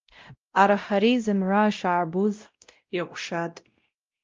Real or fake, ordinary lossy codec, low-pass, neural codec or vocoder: fake; Opus, 32 kbps; 7.2 kHz; codec, 16 kHz, 0.5 kbps, X-Codec, WavLM features, trained on Multilingual LibriSpeech